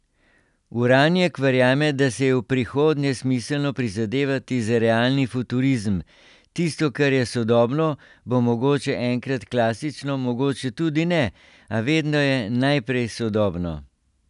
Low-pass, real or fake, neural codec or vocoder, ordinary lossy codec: 10.8 kHz; real; none; none